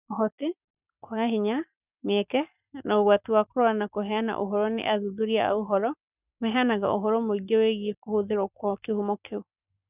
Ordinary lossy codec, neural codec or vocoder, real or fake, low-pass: none; codec, 44.1 kHz, 7.8 kbps, Pupu-Codec; fake; 3.6 kHz